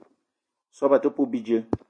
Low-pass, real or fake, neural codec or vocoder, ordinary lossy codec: 9.9 kHz; real; none; MP3, 48 kbps